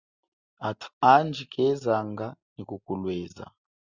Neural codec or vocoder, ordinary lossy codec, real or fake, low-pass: none; Opus, 64 kbps; real; 7.2 kHz